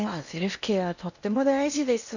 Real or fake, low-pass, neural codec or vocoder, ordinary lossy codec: fake; 7.2 kHz; codec, 16 kHz in and 24 kHz out, 0.8 kbps, FocalCodec, streaming, 65536 codes; none